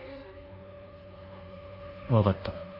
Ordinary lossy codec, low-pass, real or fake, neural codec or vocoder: Opus, 64 kbps; 5.4 kHz; fake; codec, 24 kHz, 1.2 kbps, DualCodec